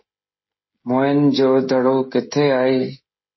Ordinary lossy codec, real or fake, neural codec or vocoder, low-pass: MP3, 24 kbps; fake; codec, 16 kHz, 16 kbps, FreqCodec, smaller model; 7.2 kHz